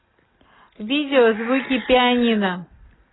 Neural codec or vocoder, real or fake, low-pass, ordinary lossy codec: none; real; 7.2 kHz; AAC, 16 kbps